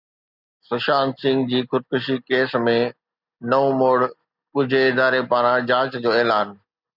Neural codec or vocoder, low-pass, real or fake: none; 5.4 kHz; real